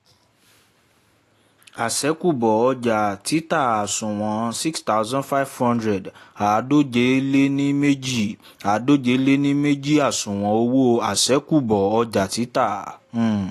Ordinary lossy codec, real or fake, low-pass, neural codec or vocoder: AAC, 48 kbps; real; 14.4 kHz; none